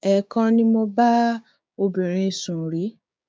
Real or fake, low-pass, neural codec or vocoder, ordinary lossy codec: fake; none; codec, 16 kHz, 6 kbps, DAC; none